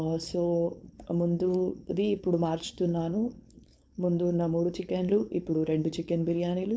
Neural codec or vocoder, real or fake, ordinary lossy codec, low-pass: codec, 16 kHz, 4.8 kbps, FACodec; fake; none; none